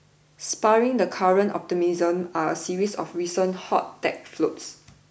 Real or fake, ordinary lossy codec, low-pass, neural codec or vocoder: real; none; none; none